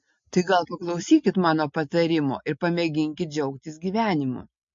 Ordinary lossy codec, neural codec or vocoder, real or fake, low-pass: MP3, 48 kbps; none; real; 7.2 kHz